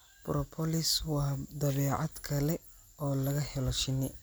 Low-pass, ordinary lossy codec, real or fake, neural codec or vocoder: none; none; real; none